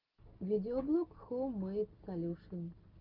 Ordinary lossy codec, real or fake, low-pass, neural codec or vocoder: Opus, 32 kbps; real; 5.4 kHz; none